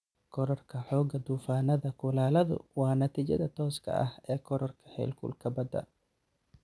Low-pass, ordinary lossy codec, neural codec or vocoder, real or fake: none; none; none; real